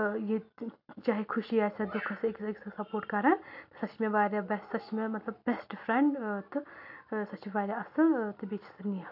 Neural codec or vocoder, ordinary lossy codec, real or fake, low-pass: none; AAC, 48 kbps; real; 5.4 kHz